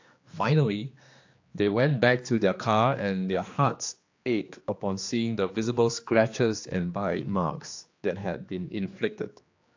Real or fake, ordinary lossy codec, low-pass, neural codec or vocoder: fake; AAC, 48 kbps; 7.2 kHz; codec, 16 kHz, 2 kbps, X-Codec, HuBERT features, trained on general audio